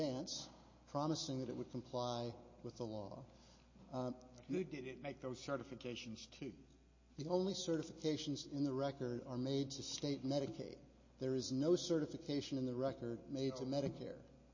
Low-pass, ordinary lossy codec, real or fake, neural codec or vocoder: 7.2 kHz; MP3, 32 kbps; real; none